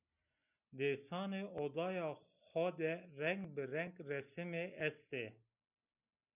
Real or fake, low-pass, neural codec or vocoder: real; 3.6 kHz; none